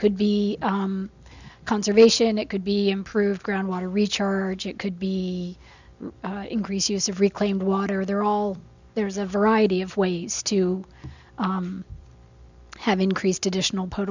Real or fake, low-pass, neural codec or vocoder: real; 7.2 kHz; none